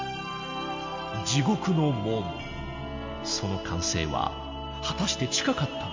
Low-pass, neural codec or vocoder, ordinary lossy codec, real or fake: 7.2 kHz; none; MP3, 64 kbps; real